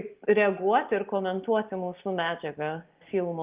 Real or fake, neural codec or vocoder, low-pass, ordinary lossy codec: real; none; 3.6 kHz; Opus, 24 kbps